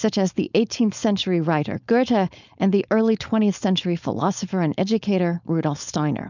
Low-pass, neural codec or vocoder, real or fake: 7.2 kHz; codec, 16 kHz, 4.8 kbps, FACodec; fake